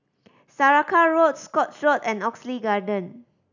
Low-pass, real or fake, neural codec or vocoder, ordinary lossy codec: 7.2 kHz; real; none; none